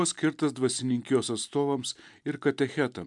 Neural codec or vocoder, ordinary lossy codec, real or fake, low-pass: none; MP3, 96 kbps; real; 10.8 kHz